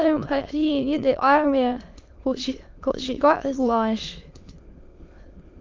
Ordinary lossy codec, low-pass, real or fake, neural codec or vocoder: Opus, 32 kbps; 7.2 kHz; fake; autoencoder, 22.05 kHz, a latent of 192 numbers a frame, VITS, trained on many speakers